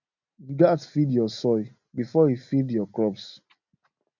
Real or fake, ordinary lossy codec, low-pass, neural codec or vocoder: real; none; 7.2 kHz; none